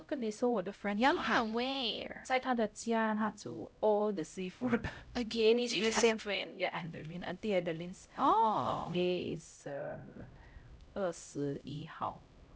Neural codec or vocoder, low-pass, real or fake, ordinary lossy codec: codec, 16 kHz, 0.5 kbps, X-Codec, HuBERT features, trained on LibriSpeech; none; fake; none